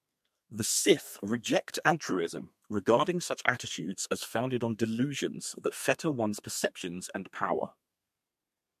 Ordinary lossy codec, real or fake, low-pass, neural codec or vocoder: MP3, 64 kbps; fake; 14.4 kHz; codec, 32 kHz, 1.9 kbps, SNAC